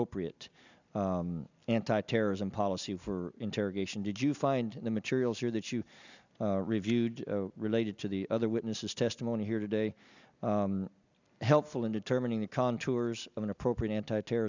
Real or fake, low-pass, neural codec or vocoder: real; 7.2 kHz; none